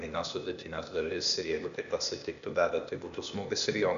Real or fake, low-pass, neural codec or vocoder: fake; 7.2 kHz; codec, 16 kHz, 0.8 kbps, ZipCodec